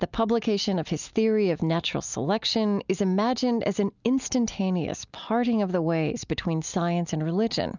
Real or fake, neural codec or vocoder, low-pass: real; none; 7.2 kHz